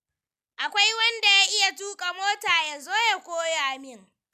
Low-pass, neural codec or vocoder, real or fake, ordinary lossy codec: 14.4 kHz; none; real; none